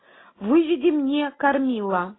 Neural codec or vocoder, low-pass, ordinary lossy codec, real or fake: none; 7.2 kHz; AAC, 16 kbps; real